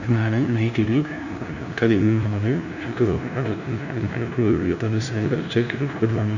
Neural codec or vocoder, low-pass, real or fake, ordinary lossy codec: codec, 16 kHz, 0.5 kbps, FunCodec, trained on LibriTTS, 25 frames a second; 7.2 kHz; fake; none